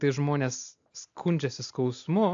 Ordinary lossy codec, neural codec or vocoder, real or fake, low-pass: AAC, 64 kbps; none; real; 7.2 kHz